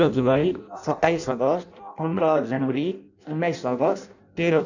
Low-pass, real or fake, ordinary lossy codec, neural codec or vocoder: 7.2 kHz; fake; none; codec, 16 kHz in and 24 kHz out, 0.6 kbps, FireRedTTS-2 codec